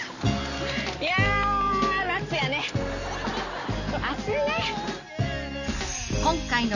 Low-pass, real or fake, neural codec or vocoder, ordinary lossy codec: 7.2 kHz; real; none; none